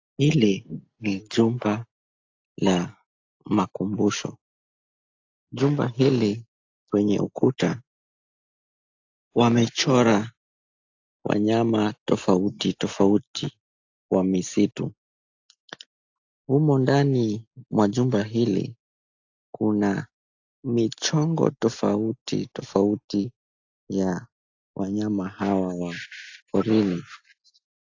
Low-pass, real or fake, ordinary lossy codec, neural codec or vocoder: 7.2 kHz; real; AAC, 48 kbps; none